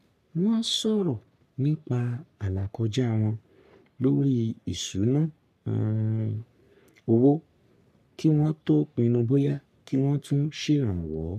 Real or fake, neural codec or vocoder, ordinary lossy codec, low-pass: fake; codec, 44.1 kHz, 3.4 kbps, Pupu-Codec; none; 14.4 kHz